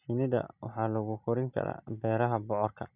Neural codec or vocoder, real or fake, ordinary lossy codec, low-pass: none; real; none; 3.6 kHz